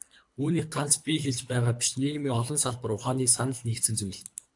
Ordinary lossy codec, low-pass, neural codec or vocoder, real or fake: AAC, 64 kbps; 10.8 kHz; codec, 24 kHz, 3 kbps, HILCodec; fake